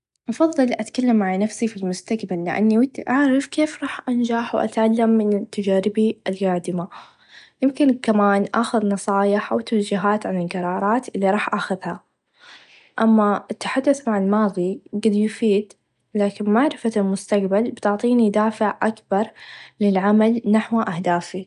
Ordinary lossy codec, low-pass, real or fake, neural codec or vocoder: none; 10.8 kHz; real; none